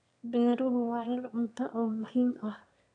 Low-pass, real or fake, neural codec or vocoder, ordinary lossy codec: 9.9 kHz; fake; autoencoder, 22.05 kHz, a latent of 192 numbers a frame, VITS, trained on one speaker; none